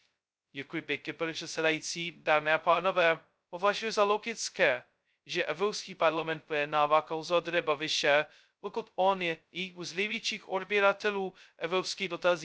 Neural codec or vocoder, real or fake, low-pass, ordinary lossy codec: codec, 16 kHz, 0.2 kbps, FocalCodec; fake; none; none